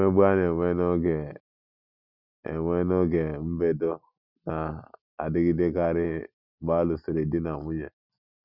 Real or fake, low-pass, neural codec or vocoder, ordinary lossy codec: real; 5.4 kHz; none; none